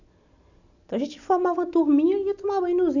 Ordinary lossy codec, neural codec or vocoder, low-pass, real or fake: none; none; 7.2 kHz; real